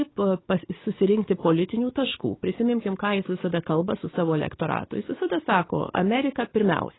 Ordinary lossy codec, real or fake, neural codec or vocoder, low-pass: AAC, 16 kbps; fake; codec, 44.1 kHz, 7.8 kbps, DAC; 7.2 kHz